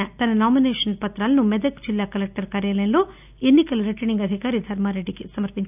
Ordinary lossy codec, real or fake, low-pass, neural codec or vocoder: none; real; 3.6 kHz; none